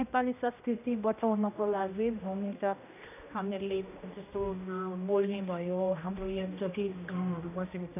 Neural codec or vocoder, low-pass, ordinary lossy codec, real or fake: codec, 16 kHz, 1 kbps, X-Codec, HuBERT features, trained on general audio; 3.6 kHz; none; fake